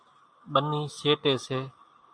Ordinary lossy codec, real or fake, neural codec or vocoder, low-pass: MP3, 96 kbps; real; none; 9.9 kHz